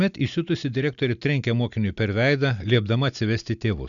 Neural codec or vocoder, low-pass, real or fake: none; 7.2 kHz; real